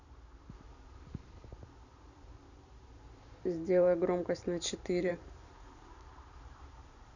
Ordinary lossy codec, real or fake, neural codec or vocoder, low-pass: none; fake; vocoder, 44.1 kHz, 128 mel bands every 512 samples, BigVGAN v2; 7.2 kHz